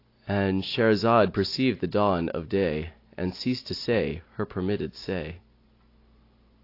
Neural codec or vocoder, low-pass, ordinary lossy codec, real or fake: none; 5.4 kHz; AAC, 32 kbps; real